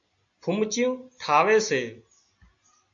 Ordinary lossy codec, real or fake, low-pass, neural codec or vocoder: AAC, 64 kbps; real; 7.2 kHz; none